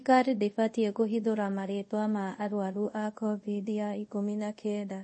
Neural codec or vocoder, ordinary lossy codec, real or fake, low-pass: codec, 24 kHz, 0.5 kbps, DualCodec; MP3, 32 kbps; fake; 10.8 kHz